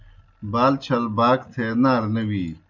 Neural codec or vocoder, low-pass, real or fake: none; 7.2 kHz; real